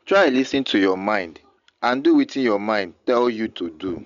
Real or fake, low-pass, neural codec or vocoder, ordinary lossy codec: real; 7.2 kHz; none; none